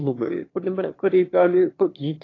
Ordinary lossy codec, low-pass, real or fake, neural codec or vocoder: AAC, 32 kbps; 7.2 kHz; fake; autoencoder, 22.05 kHz, a latent of 192 numbers a frame, VITS, trained on one speaker